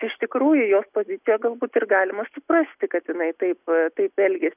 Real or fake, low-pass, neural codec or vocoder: real; 3.6 kHz; none